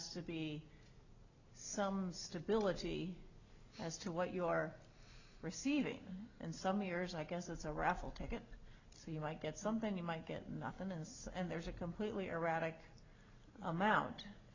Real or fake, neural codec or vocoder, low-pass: real; none; 7.2 kHz